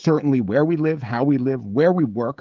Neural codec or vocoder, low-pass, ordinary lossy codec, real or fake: codec, 16 kHz, 16 kbps, FreqCodec, smaller model; 7.2 kHz; Opus, 32 kbps; fake